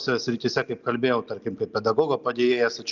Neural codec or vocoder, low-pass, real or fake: none; 7.2 kHz; real